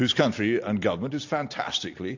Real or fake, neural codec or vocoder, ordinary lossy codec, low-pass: real; none; AAC, 48 kbps; 7.2 kHz